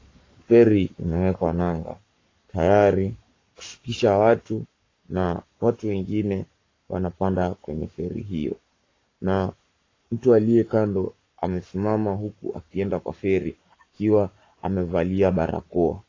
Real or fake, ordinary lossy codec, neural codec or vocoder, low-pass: fake; AAC, 32 kbps; codec, 44.1 kHz, 7.8 kbps, Pupu-Codec; 7.2 kHz